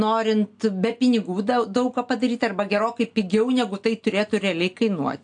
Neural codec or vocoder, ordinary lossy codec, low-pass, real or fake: none; AAC, 64 kbps; 9.9 kHz; real